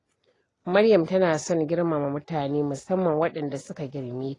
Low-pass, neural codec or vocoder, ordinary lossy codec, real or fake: 10.8 kHz; none; AAC, 32 kbps; real